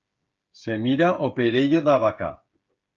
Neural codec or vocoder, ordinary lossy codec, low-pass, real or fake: codec, 16 kHz, 8 kbps, FreqCodec, smaller model; Opus, 24 kbps; 7.2 kHz; fake